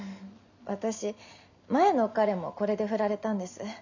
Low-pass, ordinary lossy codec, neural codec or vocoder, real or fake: 7.2 kHz; none; none; real